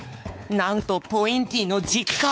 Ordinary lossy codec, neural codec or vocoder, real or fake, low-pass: none; codec, 16 kHz, 4 kbps, X-Codec, WavLM features, trained on Multilingual LibriSpeech; fake; none